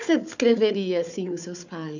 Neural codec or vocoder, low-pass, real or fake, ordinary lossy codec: codec, 16 kHz, 4 kbps, FunCodec, trained on Chinese and English, 50 frames a second; 7.2 kHz; fake; none